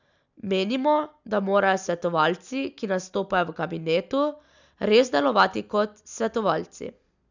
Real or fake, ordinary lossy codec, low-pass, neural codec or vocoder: real; none; 7.2 kHz; none